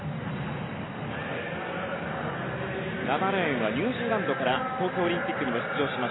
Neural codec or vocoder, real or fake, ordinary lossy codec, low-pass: none; real; AAC, 16 kbps; 7.2 kHz